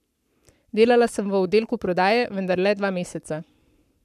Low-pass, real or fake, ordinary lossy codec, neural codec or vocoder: 14.4 kHz; fake; none; codec, 44.1 kHz, 7.8 kbps, Pupu-Codec